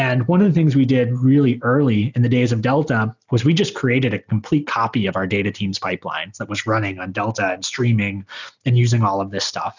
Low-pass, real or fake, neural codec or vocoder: 7.2 kHz; real; none